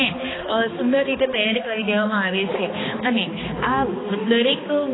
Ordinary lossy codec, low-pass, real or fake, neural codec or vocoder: AAC, 16 kbps; 7.2 kHz; fake; codec, 16 kHz, 2 kbps, X-Codec, HuBERT features, trained on balanced general audio